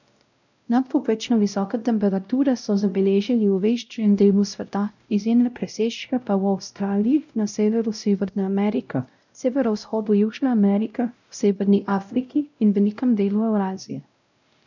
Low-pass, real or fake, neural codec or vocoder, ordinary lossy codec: 7.2 kHz; fake; codec, 16 kHz, 0.5 kbps, X-Codec, WavLM features, trained on Multilingual LibriSpeech; none